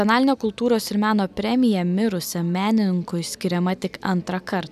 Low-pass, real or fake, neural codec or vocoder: 14.4 kHz; real; none